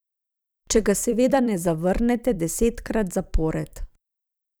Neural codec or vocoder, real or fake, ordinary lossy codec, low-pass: vocoder, 44.1 kHz, 128 mel bands every 256 samples, BigVGAN v2; fake; none; none